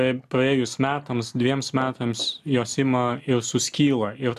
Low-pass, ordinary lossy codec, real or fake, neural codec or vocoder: 14.4 kHz; AAC, 96 kbps; real; none